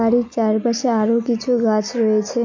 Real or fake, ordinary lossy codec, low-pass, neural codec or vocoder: real; MP3, 48 kbps; 7.2 kHz; none